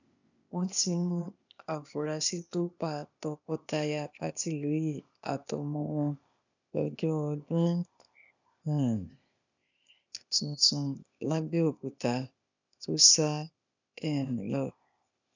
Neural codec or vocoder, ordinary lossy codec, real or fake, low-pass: codec, 16 kHz, 0.8 kbps, ZipCodec; none; fake; 7.2 kHz